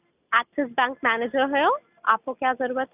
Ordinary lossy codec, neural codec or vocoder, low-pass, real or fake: none; none; 3.6 kHz; real